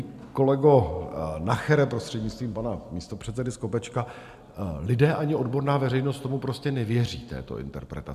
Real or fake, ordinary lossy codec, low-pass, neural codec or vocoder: real; MP3, 96 kbps; 14.4 kHz; none